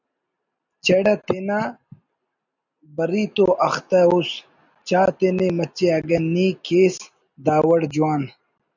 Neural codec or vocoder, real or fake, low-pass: none; real; 7.2 kHz